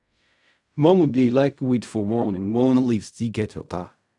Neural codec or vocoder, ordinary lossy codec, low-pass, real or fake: codec, 16 kHz in and 24 kHz out, 0.4 kbps, LongCat-Audio-Codec, fine tuned four codebook decoder; none; 10.8 kHz; fake